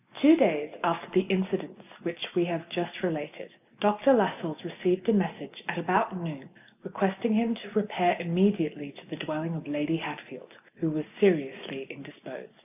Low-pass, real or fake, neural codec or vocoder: 3.6 kHz; real; none